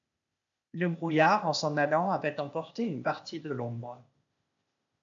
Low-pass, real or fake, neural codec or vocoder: 7.2 kHz; fake; codec, 16 kHz, 0.8 kbps, ZipCodec